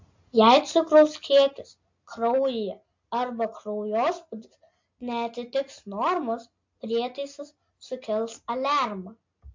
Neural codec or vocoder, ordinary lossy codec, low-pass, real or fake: none; MP3, 48 kbps; 7.2 kHz; real